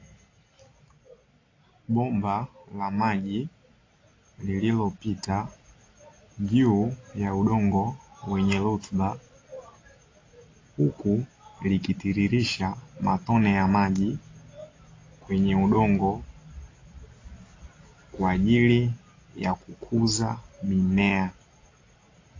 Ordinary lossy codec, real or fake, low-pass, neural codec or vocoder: AAC, 32 kbps; real; 7.2 kHz; none